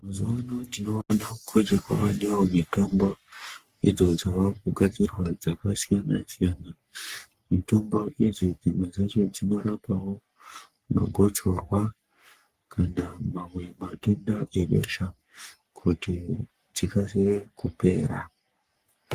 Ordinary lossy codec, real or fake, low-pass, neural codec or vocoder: Opus, 32 kbps; fake; 14.4 kHz; codec, 44.1 kHz, 3.4 kbps, Pupu-Codec